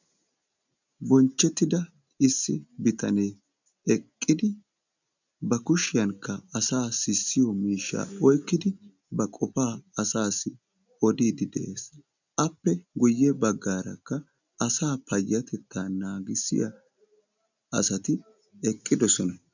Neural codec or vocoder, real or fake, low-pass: none; real; 7.2 kHz